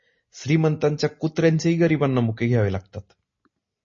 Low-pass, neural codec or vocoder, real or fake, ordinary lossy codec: 7.2 kHz; none; real; MP3, 32 kbps